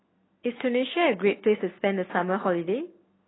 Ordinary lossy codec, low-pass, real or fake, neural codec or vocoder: AAC, 16 kbps; 7.2 kHz; real; none